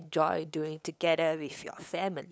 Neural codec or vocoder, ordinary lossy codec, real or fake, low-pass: codec, 16 kHz, 8 kbps, FunCodec, trained on LibriTTS, 25 frames a second; none; fake; none